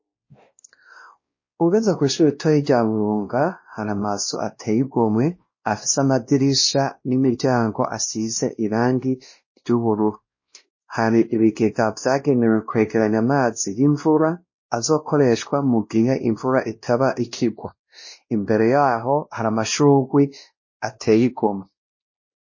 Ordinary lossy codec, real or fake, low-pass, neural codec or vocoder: MP3, 32 kbps; fake; 7.2 kHz; codec, 16 kHz, 1 kbps, X-Codec, WavLM features, trained on Multilingual LibriSpeech